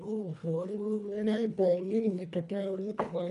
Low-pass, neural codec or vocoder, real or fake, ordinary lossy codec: 10.8 kHz; codec, 24 kHz, 1.5 kbps, HILCodec; fake; none